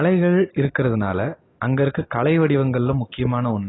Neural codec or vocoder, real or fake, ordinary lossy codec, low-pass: none; real; AAC, 16 kbps; 7.2 kHz